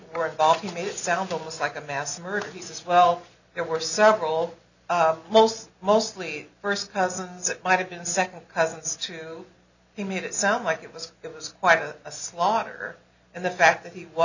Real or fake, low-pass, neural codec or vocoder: real; 7.2 kHz; none